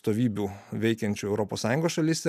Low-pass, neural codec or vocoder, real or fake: 14.4 kHz; none; real